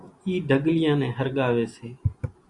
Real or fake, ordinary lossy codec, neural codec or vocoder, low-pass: real; Opus, 64 kbps; none; 10.8 kHz